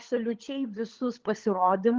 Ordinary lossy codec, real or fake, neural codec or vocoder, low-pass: Opus, 16 kbps; fake; codec, 16 kHz, 16 kbps, FunCodec, trained on LibriTTS, 50 frames a second; 7.2 kHz